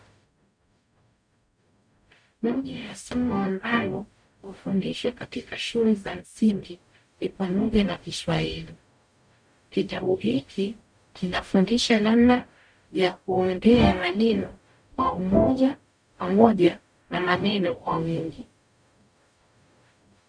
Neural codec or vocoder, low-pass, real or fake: codec, 44.1 kHz, 0.9 kbps, DAC; 9.9 kHz; fake